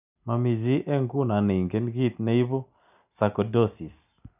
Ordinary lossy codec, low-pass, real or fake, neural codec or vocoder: none; 3.6 kHz; real; none